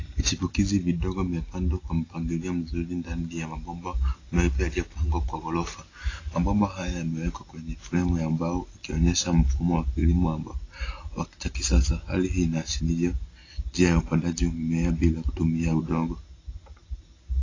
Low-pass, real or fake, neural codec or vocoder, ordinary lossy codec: 7.2 kHz; real; none; AAC, 32 kbps